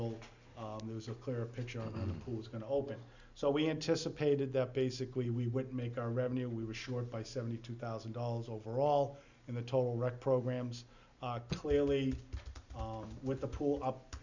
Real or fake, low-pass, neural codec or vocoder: real; 7.2 kHz; none